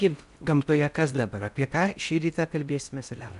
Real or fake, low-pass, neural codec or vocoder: fake; 10.8 kHz; codec, 16 kHz in and 24 kHz out, 0.6 kbps, FocalCodec, streaming, 4096 codes